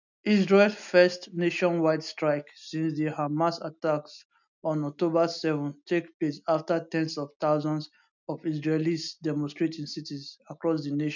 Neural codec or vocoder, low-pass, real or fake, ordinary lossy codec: none; 7.2 kHz; real; none